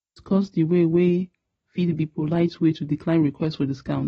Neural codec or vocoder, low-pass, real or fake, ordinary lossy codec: none; 9.9 kHz; real; AAC, 24 kbps